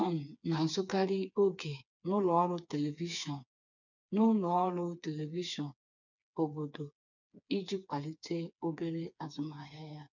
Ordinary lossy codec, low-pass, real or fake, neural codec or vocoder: none; 7.2 kHz; fake; codec, 16 kHz, 4 kbps, FreqCodec, smaller model